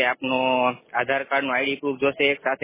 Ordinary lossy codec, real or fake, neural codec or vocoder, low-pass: MP3, 16 kbps; real; none; 3.6 kHz